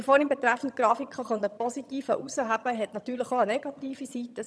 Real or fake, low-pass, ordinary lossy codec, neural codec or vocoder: fake; none; none; vocoder, 22.05 kHz, 80 mel bands, HiFi-GAN